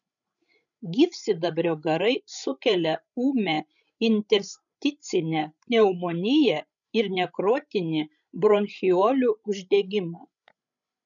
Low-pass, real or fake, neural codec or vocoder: 7.2 kHz; fake; codec, 16 kHz, 16 kbps, FreqCodec, larger model